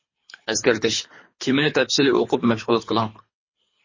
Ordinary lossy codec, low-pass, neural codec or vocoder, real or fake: MP3, 32 kbps; 7.2 kHz; codec, 24 kHz, 3 kbps, HILCodec; fake